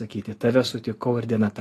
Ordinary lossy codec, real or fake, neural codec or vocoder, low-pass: AAC, 48 kbps; real; none; 14.4 kHz